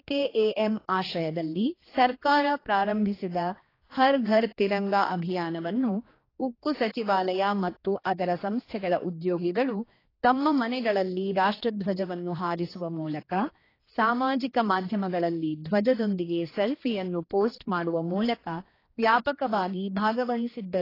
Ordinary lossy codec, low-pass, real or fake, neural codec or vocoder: AAC, 24 kbps; 5.4 kHz; fake; codec, 16 kHz, 2 kbps, X-Codec, HuBERT features, trained on general audio